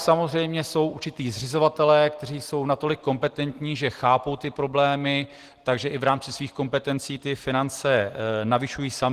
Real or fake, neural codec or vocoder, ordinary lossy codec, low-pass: real; none; Opus, 16 kbps; 14.4 kHz